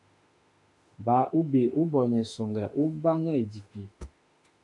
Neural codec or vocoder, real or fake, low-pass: autoencoder, 48 kHz, 32 numbers a frame, DAC-VAE, trained on Japanese speech; fake; 10.8 kHz